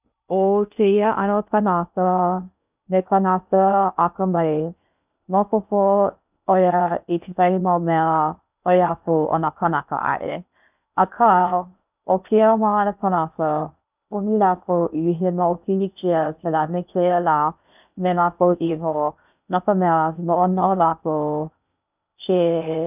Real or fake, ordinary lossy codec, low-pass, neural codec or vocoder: fake; none; 3.6 kHz; codec, 16 kHz in and 24 kHz out, 0.6 kbps, FocalCodec, streaming, 2048 codes